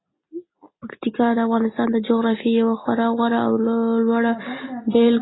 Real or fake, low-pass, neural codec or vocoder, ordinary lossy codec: real; 7.2 kHz; none; AAC, 16 kbps